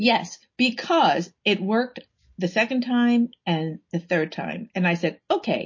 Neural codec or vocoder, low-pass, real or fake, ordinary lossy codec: none; 7.2 kHz; real; MP3, 32 kbps